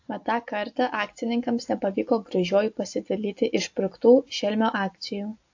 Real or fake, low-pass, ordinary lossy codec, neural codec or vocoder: real; 7.2 kHz; AAC, 48 kbps; none